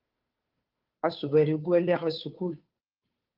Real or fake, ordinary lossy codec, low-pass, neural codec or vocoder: fake; Opus, 32 kbps; 5.4 kHz; codec, 16 kHz, 2 kbps, FunCodec, trained on Chinese and English, 25 frames a second